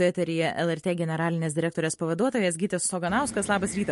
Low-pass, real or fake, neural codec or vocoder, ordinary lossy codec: 14.4 kHz; real; none; MP3, 48 kbps